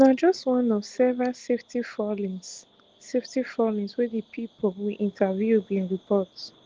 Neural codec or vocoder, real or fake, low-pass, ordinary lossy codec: none; real; 7.2 kHz; Opus, 16 kbps